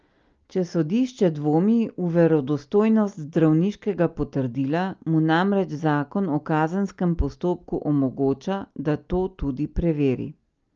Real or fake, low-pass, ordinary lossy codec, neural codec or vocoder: real; 7.2 kHz; Opus, 24 kbps; none